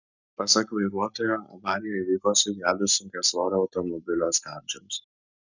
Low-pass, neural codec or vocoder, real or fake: 7.2 kHz; codec, 44.1 kHz, 7.8 kbps, Pupu-Codec; fake